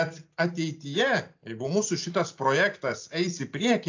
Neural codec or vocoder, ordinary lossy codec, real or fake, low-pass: none; AAC, 48 kbps; real; 7.2 kHz